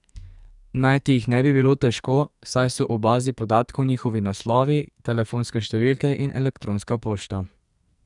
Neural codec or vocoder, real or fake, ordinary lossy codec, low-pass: codec, 44.1 kHz, 2.6 kbps, SNAC; fake; none; 10.8 kHz